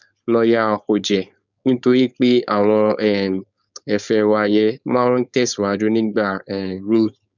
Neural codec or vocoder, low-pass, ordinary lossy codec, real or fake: codec, 16 kHz, 4.8 kbps, FACodec; 7.2 kHz; none; fake